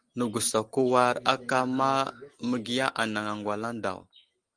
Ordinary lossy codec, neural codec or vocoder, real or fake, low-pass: Opus, 24 kbps; none; real; 9.9 kHz